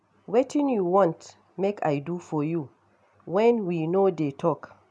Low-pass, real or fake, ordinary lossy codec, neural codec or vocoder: none; real; none; none